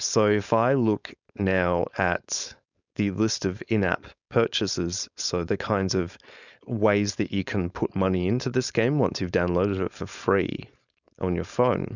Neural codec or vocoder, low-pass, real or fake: codec, 16 kHz, 4.8 kbps, FACodec; 7.2 kHz; fake